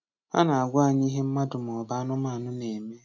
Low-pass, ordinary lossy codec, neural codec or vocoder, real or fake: 7.2 kHz; none; none; real